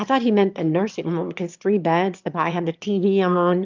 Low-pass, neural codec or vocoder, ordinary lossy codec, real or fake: 7.2 kHz; autoencoder, 22.05 kHz, a latent of 192 numbers a frame, VITS, trained on one speaker; Opus, 24 kbps; fake